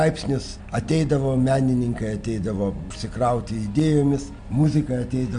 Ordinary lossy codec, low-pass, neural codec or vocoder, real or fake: MP3, 64 kbps; 9.9 kHz; none; real